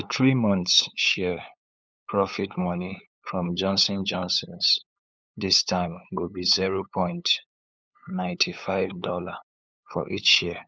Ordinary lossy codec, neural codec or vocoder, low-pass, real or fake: none; codec, 16 kHz, 8 kbps, FunCodec, trained on LibriTTS, 25 frames a second; none; fake